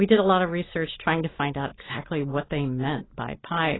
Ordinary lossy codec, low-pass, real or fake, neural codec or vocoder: AAC, 16 kbps; 7.2 kHz; real; none